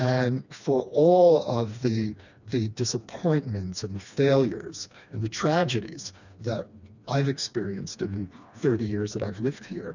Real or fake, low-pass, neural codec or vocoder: fake; 7.2 kHz; codec, 16 kHz, 2 kbps, FreqCodec, smaller model